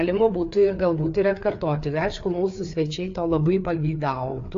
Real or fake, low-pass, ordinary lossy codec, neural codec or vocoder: fake; 7.2 kHz; AAC, 48 kbps; codec, 16 kHz, 4 kbps, FreqCodec, larger model